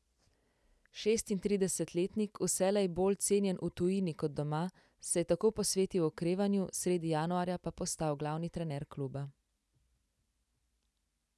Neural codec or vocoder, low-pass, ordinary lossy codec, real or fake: none; none; none; real